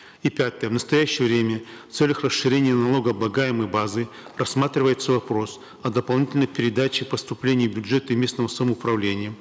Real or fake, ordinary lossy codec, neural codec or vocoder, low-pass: real; none; none; none